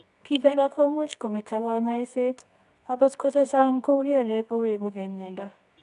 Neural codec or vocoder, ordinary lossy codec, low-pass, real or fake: codec, 24 kHz, 0.9 kbps, WavTokenizer, medium music audio release; none; 10.8 kHz; fake